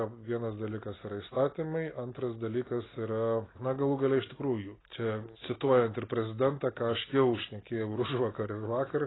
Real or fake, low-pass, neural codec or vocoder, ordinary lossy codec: real; 7.2 kHz; none; AAC, 16 kbps